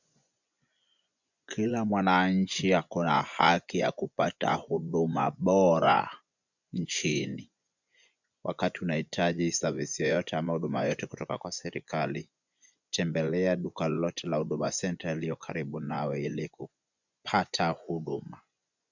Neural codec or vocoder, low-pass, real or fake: vocoder, 24 kHz, 100 mel bands, Vocos; 7.2 kHz; fake